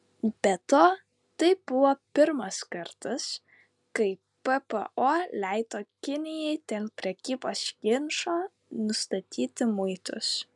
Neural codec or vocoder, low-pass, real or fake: none; 10.8 kHz; real